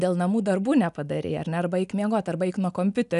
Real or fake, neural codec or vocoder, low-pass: real; none; 10.8 kHz